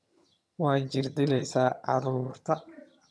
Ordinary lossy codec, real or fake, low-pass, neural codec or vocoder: none; fake; none; vocoder, 22.05 kHz, 80 mel bands, HiFi-GAN